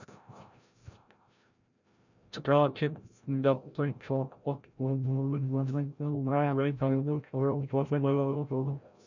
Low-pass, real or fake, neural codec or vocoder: 7.2 kHz; fake; codec, 16 kHz, 0.5 kbps, FreqCodec, larger model